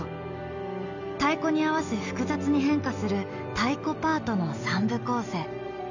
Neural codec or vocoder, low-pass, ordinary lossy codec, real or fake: none; 7.2 kHz; none; real